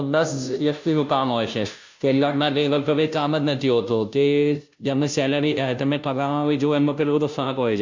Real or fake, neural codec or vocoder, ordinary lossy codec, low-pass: fake; codec, 16 kHz, 0.5 kbps, FunCodec, trained on Chinese and English, 25 frames a second; MP3, 48 kbps; 7.2 kHz